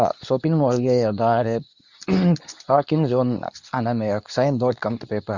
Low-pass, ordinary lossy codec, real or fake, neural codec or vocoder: 7.2 kHz; none; fake; codec, 24 kHz, 0.9 kbps, WavTokenizer, medium speech release version 2